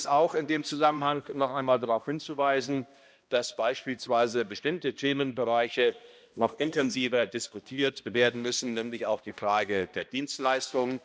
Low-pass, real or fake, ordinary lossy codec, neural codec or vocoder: none; fake; none; codec, 16 kHz, 1 kbps, X-Codec, HuBERT features, trained on balanced general audio